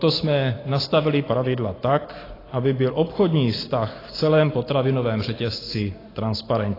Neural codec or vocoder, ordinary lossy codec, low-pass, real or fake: none; AAC, 24 kbps; 5.4 kHz; real